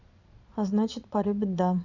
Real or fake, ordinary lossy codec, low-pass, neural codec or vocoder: fake; none; 7.2 kHz; vocoder, 22.05 kHz, 80 mel bands, Vocos